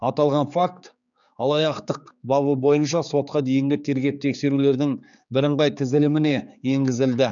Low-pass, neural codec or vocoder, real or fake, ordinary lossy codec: 7.2 kHz; codec, 16 kHz, 4 kbps, X-Codec, HuBERT features, trained on general audio; fake; none